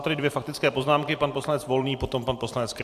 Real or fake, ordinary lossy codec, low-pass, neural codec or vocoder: fake; AAC, 96 kbps; 14.4 kHz; vocoder, 44.1 kHz, 128 mel bands every 256 samples, BigVGAN v2